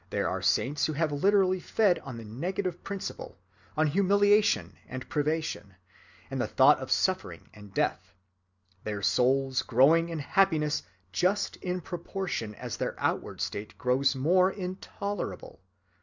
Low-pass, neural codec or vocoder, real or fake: 7.2 kHz; none; real